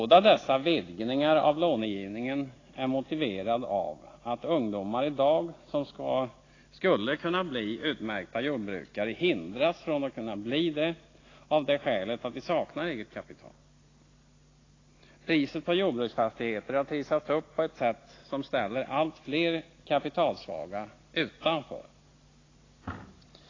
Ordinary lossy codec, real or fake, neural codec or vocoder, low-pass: AAC, 32 kbps; real; none; 7.2 kHz